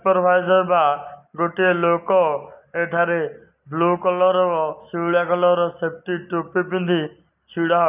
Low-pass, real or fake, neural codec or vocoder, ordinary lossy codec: 3.6 kHz; real; none; none